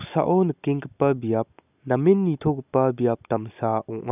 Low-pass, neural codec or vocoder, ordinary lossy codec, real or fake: 3.6 kHz; none; none; real